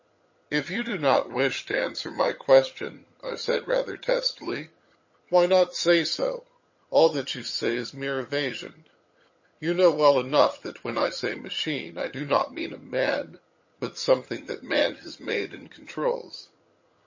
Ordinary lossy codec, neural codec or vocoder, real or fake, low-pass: MP3, 32 kbps; vocoder, 22.05 kHz, 80 mel bands, HiFi-GAN; fake; 7.2 kHz